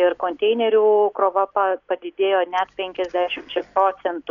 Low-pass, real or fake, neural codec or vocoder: 7.2 kHz; real; none